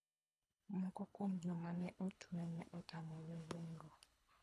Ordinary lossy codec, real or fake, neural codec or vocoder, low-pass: none; fake; codec, 24 kHz, 3 kbps, HILCodec; none